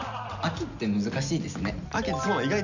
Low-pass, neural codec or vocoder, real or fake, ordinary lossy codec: 7.2 kHz; none; real; none